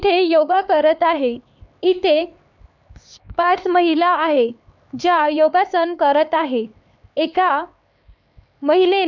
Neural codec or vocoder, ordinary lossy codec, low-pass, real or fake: codec, 16 kHz, 4 kbps, X-Codec, HuBERT features, trained on LibriSpeech; none; 7.2 kHz; fake